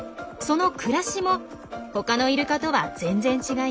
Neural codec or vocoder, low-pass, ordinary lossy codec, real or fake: none; none; none; real